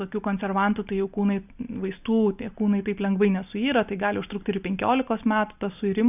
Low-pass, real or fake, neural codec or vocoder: 3.6 kHz; real; none